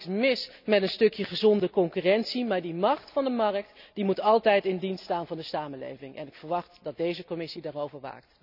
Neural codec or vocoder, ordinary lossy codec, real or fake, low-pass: none; none; real; 5.4 kHz